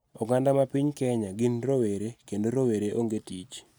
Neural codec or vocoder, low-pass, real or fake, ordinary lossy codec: none; none; real; none